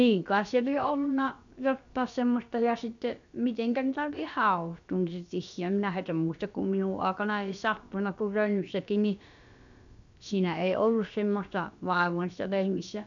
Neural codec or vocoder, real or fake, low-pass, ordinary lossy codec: codec, 16 kHz, about 1 kbps, DyCAST, with the encoder's durations; fake; 7.2 kHz; none